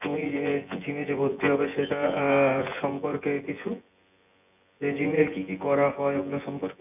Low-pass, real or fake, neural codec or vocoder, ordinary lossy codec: 3.6 kHz; fake; vocoder, 24 kHz, 100 mel bands, Vocos; none